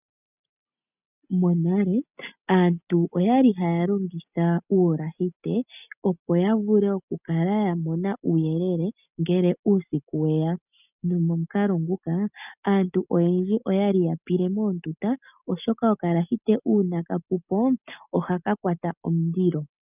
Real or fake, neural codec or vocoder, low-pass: real; none; 3.6 kHz